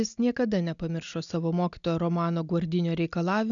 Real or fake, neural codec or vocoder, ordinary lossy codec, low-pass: real; none; MP3, 96 kbps; 7.2 kHz